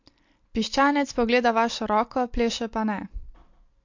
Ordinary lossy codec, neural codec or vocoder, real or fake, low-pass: MP3, 48 kbps; none; real; 7.2 kHz